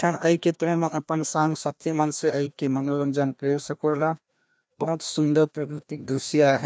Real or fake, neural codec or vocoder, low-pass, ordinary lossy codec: fake; codec, 16 kHz, 1 kbps, FreqCodec, larger model; none; none